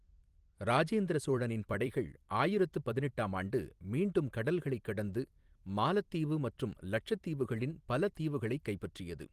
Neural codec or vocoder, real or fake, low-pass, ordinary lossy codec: vocoder, 44.1 kHz, 128 mel bands every 256 samples, BigVGAN v2; fake; 14.4 kHz; Opus, 24 kbps